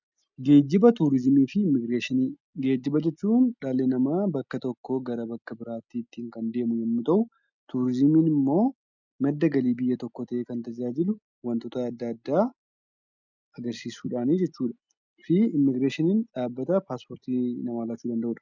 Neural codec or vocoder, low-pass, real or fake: none; 7.2 kHz; real